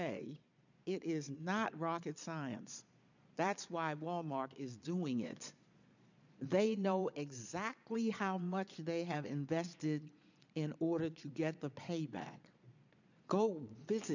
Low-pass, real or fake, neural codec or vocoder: 7.2 kHz; fake; vocoder, 22.05 kHz, 80 mel bands, Vocos